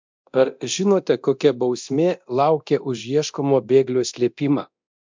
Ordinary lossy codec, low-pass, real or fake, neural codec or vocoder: MP3, 64 kbps; 7.2 kHz; fake; codec, 24 kHz, 0.9 kbps, DualCodec